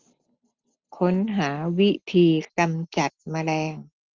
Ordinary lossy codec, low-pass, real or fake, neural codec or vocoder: Opus, 32 kbps; 7.2 kHz; real; none